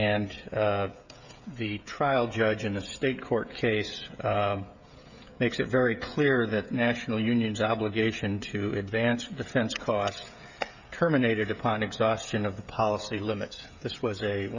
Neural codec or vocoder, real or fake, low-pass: codec, 16 kHz, 16 kbps, FreqCodec, smaller model; fake; 7.2 kHz